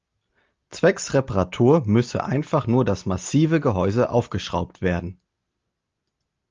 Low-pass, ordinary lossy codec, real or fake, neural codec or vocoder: 7.2 kHz; Opus, 32 kbps; real; none